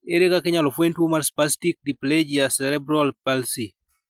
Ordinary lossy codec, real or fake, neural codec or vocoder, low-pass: Opus, 32 kbps; real; none; 19.8 kHz